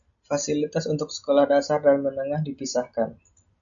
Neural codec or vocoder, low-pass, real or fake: none; 7.2 kHz; real